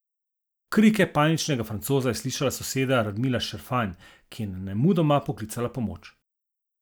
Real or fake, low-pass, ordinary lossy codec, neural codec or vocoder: real; none; none; none